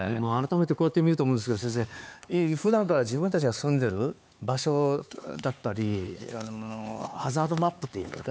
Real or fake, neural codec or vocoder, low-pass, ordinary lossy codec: fake; codec, 16 kHz, 4 kbps, X-Codec, HuBERT features, trained on LibriSpeech; none; none